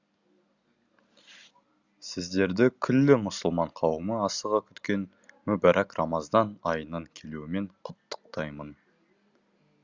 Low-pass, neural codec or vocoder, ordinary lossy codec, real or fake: 7.2 kHz; none; Opus, 64 kbps; real